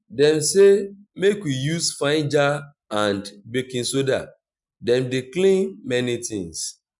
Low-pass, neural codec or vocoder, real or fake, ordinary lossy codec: 10.8 kHz; none; real; none